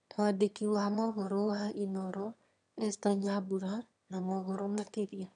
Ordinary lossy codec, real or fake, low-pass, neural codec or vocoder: none; fake; 9.9 kHz; autoencoder, 22.05 kHz, a latent of 192 numbers a frame, VITS, trained on one speaker